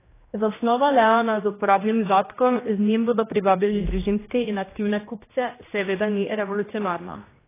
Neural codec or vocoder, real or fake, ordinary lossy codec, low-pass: codec, 16 kHz, 1 kbps, X-Codec, HuBERT features, trained on general audio; fake; AAC, 16 kbps; 3.6 kHz